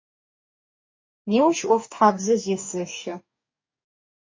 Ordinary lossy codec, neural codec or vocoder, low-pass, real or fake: MP3, 32 kbps; codec, 44.1 kHz, 2.6 kbps, DAC; 7.2 kHz; fake